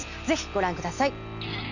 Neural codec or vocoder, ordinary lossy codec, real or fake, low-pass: none; none; real; 7.2 kHz